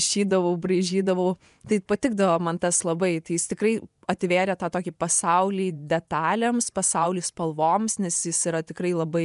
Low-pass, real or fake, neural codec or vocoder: 10.8 kHz; fake; vocoder, 24 kHz, 100 mel bands, Vocos